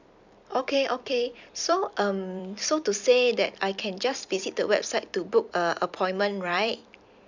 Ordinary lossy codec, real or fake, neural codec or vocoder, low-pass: none; fake; vocoder, 44.1 kHz, 128 mel bands every 256 samples, BigVGAN v2; 7.2 kHz